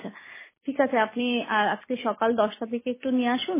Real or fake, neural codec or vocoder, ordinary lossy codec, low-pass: real; none; MP3, 16 kbps; 3.6 kHz